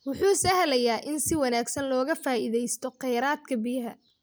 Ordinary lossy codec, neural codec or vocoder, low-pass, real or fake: none; none; none; real